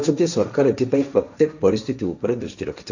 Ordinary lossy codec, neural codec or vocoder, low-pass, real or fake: none; codec, 16 kHz, 1.1 kbps, Voila-Tokenizer; 7.2 kHz; fake